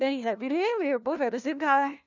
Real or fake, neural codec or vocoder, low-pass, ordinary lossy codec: fake; codec, 16 kHz, 1 kbps, FunCodec, trained on LibriTTS, 50 frames a second; 7.2 kHz; none